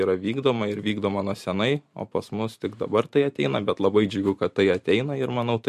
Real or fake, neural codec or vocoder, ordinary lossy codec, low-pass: fake; vocoder, 44.1 kHz, 128 mel bands every 512 samples, BigVGAN v2; MP3, 64 kbps; 14.4 kHz